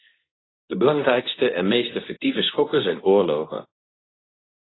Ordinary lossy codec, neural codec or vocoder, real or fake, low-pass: AAC, 16 kbps; codec, 24 kHz, 0.9 kbps, WavTokenizer, medium speech release version 2; fake; 7.2 kHz